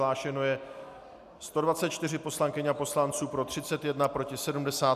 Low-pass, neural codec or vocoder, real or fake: 14.4 kHz; none; real